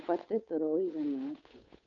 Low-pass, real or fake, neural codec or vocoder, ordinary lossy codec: 7.2 kHz; fake; codec, 16 kHz, 8 kbps, FunCodec, trained on Chinese and English, 25 frames a second; none